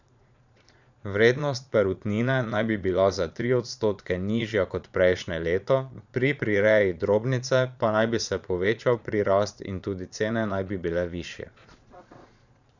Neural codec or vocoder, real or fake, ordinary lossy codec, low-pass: vocoder, 22.05 kHz, 80 mel bands, Vocos; fake; none; 7.2 kHz